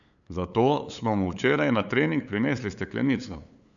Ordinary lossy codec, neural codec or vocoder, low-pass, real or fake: none; codec, 16 kHz, 8 kbps, FunCodec, trained on LibriTTS, 25 frames a second; 7.2 kHz; fake